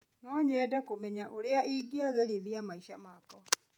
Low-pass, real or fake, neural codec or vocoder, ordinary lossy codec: 19.8 kHz; fake; vocoder, 48 kHz, 128 mel bands, Vocos; none